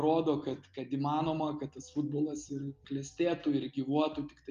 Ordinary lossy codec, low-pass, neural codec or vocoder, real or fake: Opus, 32 kbps; 7.2 kHz; none; real